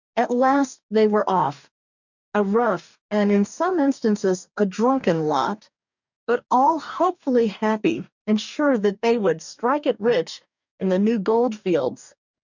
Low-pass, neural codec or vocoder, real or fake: 7.2 kHz; codec, 44.1 kHz, 2.6 kbps, DAC; fake